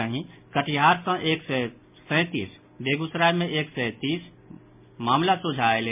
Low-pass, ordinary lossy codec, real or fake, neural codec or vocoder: 3.6 kHz; MP3, 32 kbps; real; none